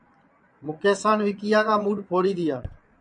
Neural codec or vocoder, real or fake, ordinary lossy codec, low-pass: vocoder, 22.05 kHz, 80 mel bands, Vocos; fake; MP3, 64 kbps; 9.9 kHz